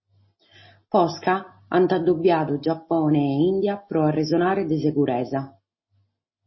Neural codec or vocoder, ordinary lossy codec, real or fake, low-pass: none; MP3, 24 kbps; real; 7.2 kHz